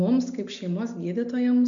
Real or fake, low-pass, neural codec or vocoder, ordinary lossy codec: real; 7.2 kHz; none; MP3, 64 kbps